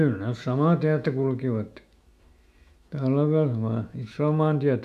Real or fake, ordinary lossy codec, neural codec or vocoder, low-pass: fake; none; autoencoder, 48 kHz, 128 numbers a frame, DAC-VAE, trained on Japanese speech; 14.4 kHz